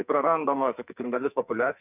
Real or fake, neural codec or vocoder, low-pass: fake; codec, 44.1 kHz, 2.6 kbps, SNAC; 3.6 kHz